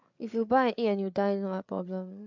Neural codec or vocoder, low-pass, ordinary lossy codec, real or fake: codec, 16 kHz, 8 kbps, FreqCodec, larger model; 7.2 kHz; MP3, 64 kbps; fake